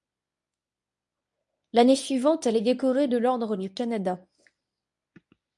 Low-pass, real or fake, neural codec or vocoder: 10.8 kHz; fake; codec, 24 kHz, 0.9 kbps, WavTokenizer, medium speech release version 1